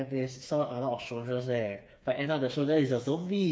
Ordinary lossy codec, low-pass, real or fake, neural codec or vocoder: none; none; fake; codec, 16 kHz, 4 kbps, FreqCodec, smaller model